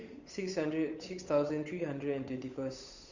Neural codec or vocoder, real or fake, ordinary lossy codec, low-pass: codec, 16 kHz, 8 kbps, FunCodec, trained on Chinese and English, 25 frames a second; fake; Opus, 64 kbps; 7.2 kHz